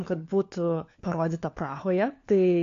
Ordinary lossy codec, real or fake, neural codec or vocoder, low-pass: AAC, 48 kbps; fake; codec, 16 kHz, 4 kbps, FunCodec, trained on LibriTTS, 50 frames a second; 7.2 kHz